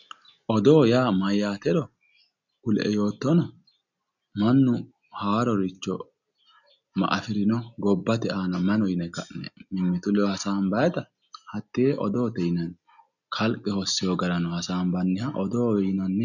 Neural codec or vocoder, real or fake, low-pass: none; real; 7.2 kHz